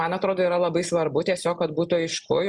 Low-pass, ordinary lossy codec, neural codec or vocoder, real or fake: 10.8 kHz; Opus, 64 kbps; none; real